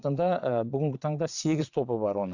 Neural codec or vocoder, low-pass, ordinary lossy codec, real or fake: vocoder, 22.05 kHz, 80 mel bands, Vocos; 7.2 kHz; none; fake